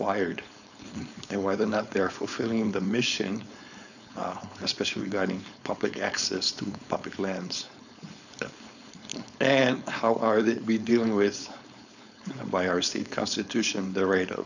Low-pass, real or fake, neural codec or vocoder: 7.2 kHz; fake; codec, 16 kHz, 4.8 kbps, FACodec